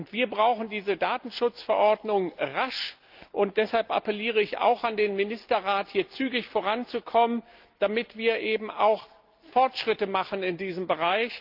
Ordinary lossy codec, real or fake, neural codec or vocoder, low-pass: Opus, 24 kbps; real; none; 5.4 kHz